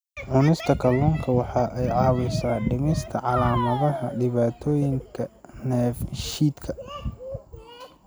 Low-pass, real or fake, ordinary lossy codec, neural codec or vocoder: none; real; none; none